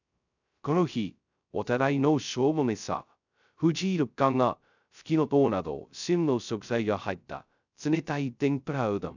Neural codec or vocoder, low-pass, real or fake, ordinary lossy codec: codec, 16 kHz, 0.2 kbps, FocalCodec; 7.2 kHz; fake; none